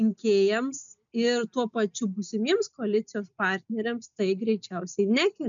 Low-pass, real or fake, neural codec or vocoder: 7.2 kHz; real; none